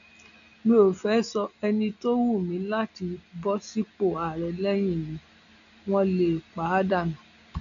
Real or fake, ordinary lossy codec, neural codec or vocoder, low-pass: real; none; none; 7.2 kHz